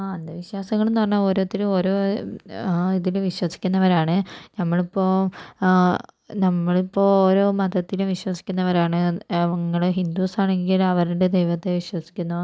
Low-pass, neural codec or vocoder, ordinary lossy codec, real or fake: none; none; none; real